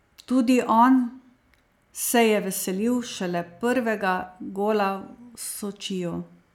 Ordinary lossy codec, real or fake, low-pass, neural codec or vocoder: none; real; 19.8 kHz; none